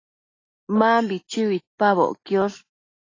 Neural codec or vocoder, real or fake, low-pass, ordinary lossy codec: none; real; 7.2 kHz; AAC, 32 kbps